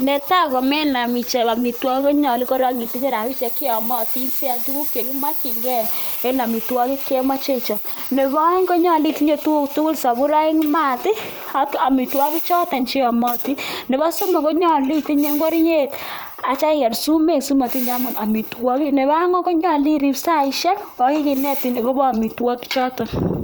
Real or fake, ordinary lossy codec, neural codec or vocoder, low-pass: fake; none; vocoder, 44.1 kHz, 128 mel bands, Pupu-Vocoder; none